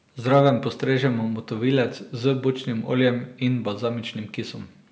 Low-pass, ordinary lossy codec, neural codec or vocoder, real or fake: none; none; none; real